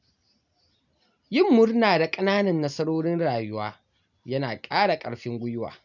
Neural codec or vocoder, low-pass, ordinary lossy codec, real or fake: none; 7.2 kHz; none; real